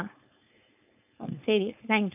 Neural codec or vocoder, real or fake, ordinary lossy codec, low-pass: codec, 16 kHz, 4.8 kbps, FACodec; fake; none; 3.6 kHz